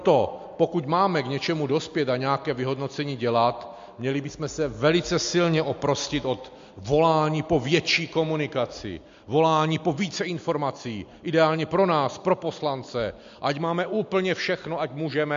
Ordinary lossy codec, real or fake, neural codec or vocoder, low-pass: MP3, 48 kbps; real; none; 7.2 kHz